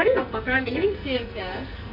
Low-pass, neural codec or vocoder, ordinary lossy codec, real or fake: 5.4 kHz; codec, 44.1 kHz, 2.6 kbps, SNAC; none; fake